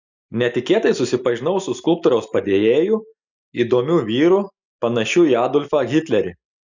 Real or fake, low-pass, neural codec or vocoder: real; 7.2 kHz; none